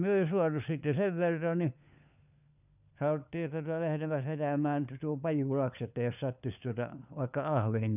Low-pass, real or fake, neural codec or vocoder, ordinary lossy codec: 3.6 kHz; fake; codec, 16 kHz, 4 kbps, FunCodec, trained on LibriTTS, 50 frames a second; none